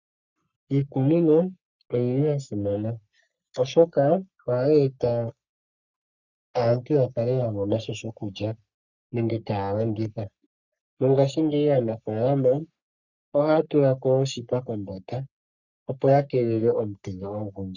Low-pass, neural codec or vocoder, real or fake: 7.2 kHz; codec, 44.1 kHz, 3.4 kbps, Pupu-Codec; fake